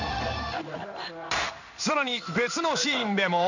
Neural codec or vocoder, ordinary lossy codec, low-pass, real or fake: codec, 16 kHz in and 24 kHz out, 1 kbps, XY-Tokenizer; none; 7.2 kHz; fake